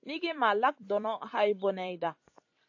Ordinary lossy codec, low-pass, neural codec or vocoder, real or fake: AAC, 48 kbps; 7.2 kHz; vocoder, 44.1 kHz, 128 mel bands every 256 samples, BigVGAN v2; fake